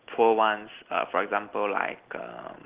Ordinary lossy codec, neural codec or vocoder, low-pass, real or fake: Opus, 16 kbps; none; 3.6 kHz; real